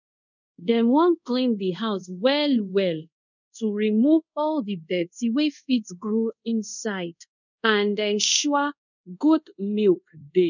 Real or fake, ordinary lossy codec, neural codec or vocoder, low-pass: fake; none; codec, 24 kHz, 0.5 kbps, DualCodec; 7.2 kHz